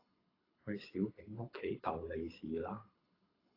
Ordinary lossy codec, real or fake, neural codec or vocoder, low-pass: AAC, 24 kbps; fake; codec, 24 kHz, 6 kbps, HILCodec; 5.4 kHz